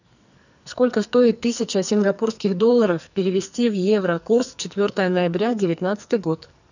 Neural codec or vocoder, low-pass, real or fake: codec, 44.1 kHz, 2.6 kbps, SNAC; 7.2 kHz; fake